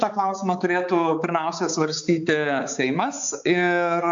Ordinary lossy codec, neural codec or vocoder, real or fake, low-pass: AAC, 64 kbps; codec, 16 kHz, 4 kbps, X-Codec, HuBERT features, trained on balanced general audio; fake; 7.2 kHz